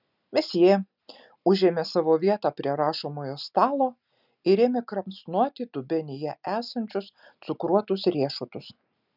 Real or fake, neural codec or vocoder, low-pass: real; none; 5.4 kHz